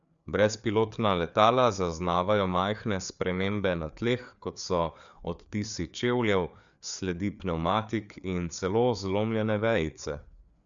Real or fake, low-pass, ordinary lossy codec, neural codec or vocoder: fake; 7.2 kHz; MP3, 96 kbps; codec, 16 kHz, 4 kbps, FreqCodec, larger model